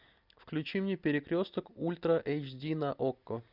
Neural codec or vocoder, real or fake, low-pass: none; real; 5.4 kHz